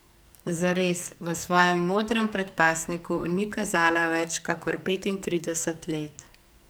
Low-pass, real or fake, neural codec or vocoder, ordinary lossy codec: none; fake; codec, 44.1 kHz, 2.6 kbps, SNAC; none